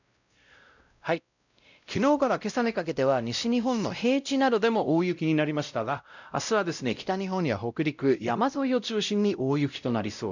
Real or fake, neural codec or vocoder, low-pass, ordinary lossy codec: fake; codec, 16 kHz, 0.5 kbps, X-Codec, WavLM features, trained on Multilingual LibriSpeech; 7.2 kHz; none